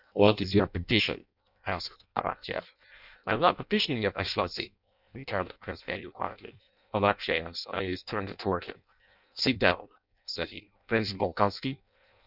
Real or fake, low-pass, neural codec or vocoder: fake; 5.4 kHz; codec, 16 kHz in and 24 kHz out, 0.6 kbps, FireRedTTS-2 codec